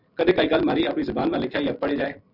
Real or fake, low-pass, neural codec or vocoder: real; 5.4 kHz; none